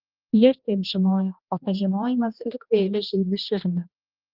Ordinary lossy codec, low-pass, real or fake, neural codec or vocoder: Opus, 24 kbps; 5.4 kHz; fake; codec, 16 kHz, 1 kbps, X-Codec, HuBERT features, trained on general audio